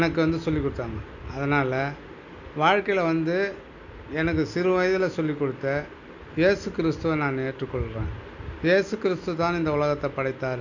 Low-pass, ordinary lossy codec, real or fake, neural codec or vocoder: 7.2 kHz; none; real; none